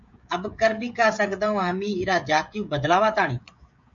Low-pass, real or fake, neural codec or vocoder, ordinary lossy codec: 7.2 kHz; fake; codec, 16 kHz, 16 kbps, FreqCodec, smaller model; MP3, 48 kbps